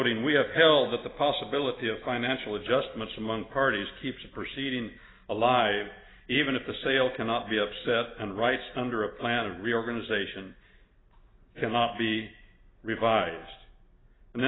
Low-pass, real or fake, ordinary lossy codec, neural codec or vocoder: 7.2 kHz; real; AAC, 16 kbps; none